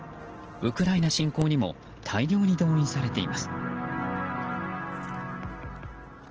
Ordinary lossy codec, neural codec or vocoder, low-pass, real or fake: Opus, 16 kbps; none; 7.2 kHz; real